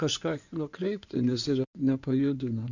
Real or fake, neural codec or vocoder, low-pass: fake; codec, 16 kHz in and 24 kHz out, 2.2 kbps, FireRedTTS-2 codec; 7.2 kHz